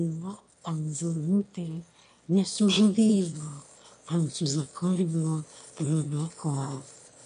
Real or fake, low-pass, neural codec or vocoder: fake; 9.9 kHz; autoencoder, 22.05 kHz, a latent of 192 numbers a frame, VITS, trained on one speaker